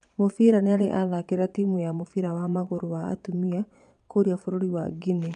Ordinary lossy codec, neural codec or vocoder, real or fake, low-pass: none; vocoder, 22.05 kHz, 80 mel bands, Vocos; fake; 9.9 kHz